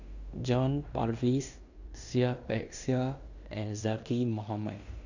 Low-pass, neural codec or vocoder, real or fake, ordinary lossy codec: 7.2 kHz; codec, 16 kHz in and 24 kHz out, 0.9 kbps, LongCat-Audio-Codec, fine tuned four codebook decoder; fake; none